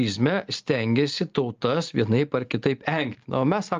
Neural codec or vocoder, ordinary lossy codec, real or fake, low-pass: none; Opus, 24 kbps; real; 7.2 kHz